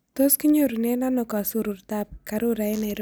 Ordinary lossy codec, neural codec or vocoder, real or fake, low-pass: none; none; real; none